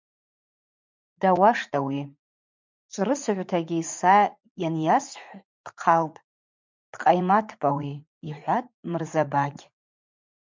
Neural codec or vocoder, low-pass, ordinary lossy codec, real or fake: vocoder, 44.1 kHz, 80 mel bands, Vocos; 7.2 kHz; MP3, 64 kbps; fake